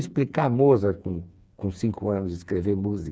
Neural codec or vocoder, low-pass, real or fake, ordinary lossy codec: codec, 16 kHz, 4 kbps, FreqCodec, smaller model; none; fake; none